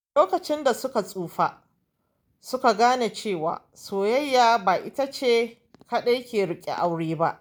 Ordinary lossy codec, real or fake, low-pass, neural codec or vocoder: none; real; none; none